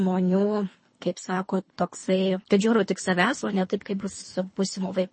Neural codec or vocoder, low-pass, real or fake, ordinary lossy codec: codec, 24 kHz, 3 kbps, HILCodec; 9.9 kHz; fake; MP3, 32 kbps